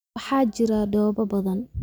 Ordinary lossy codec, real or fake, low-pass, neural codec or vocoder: none; real; none; none